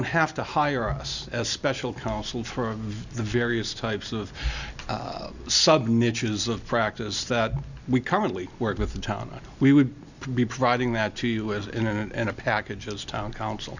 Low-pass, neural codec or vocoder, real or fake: 7.2 kHz; none; real